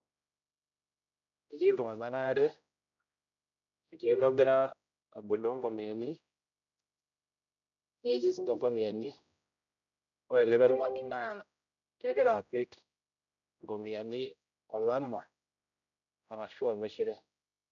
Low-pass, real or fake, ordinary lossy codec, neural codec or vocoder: 7.2 kHz; fake; none; codec, 16 kHz, 0.5 kbps, X-Codec, HuBERT features, trained on general audio